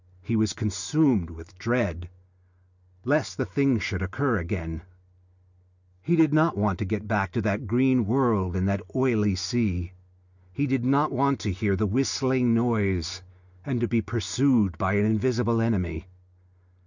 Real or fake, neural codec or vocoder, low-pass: real; none; 7.2 kHz